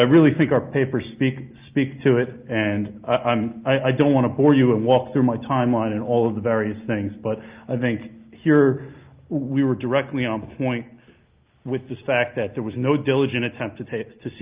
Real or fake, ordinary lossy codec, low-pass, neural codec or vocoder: real; Opus, 32 kbps; 3.6 kHz; none